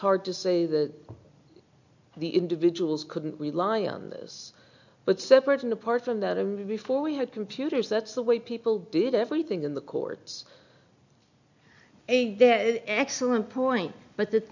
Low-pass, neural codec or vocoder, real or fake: 7.2 kHz; none; real